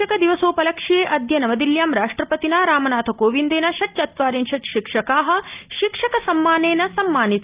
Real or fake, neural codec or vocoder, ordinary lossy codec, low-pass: real; none; Opus, 24 kbps; 3.6 kHz